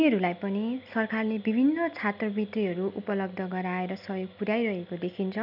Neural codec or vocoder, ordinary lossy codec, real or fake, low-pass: none; none; real; 5.4 kHz